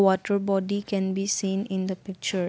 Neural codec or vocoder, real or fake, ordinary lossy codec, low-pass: none; real; none; none